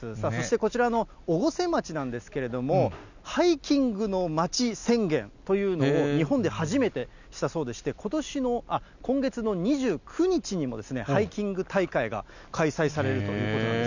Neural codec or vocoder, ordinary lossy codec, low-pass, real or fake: none; none; 7.2 kHz; real